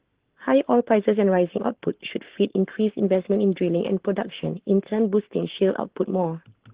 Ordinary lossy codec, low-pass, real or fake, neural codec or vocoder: Opus, 16 kbps; 3.6 kHz; fake; codec, 44.1 kHz, 7.8 kbps, Pupu-Codec